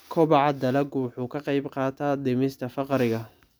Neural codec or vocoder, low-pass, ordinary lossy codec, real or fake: none; none; none; real